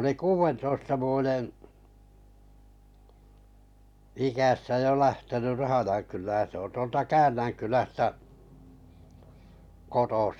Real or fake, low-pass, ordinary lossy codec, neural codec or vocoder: real; 19.8 kHz; none; none